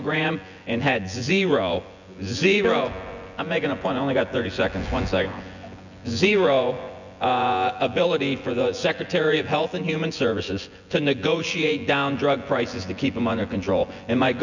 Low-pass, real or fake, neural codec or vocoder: 7.2 kHz; fake; vocoder, 24 kHz, 100 mel bands, Vocos